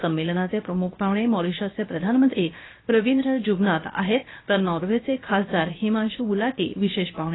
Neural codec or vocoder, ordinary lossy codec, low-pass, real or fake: codec, 16 kHz, 0.7 kbps, FocalCodec; AAC, 16 kbps; 7.2 kHz; fake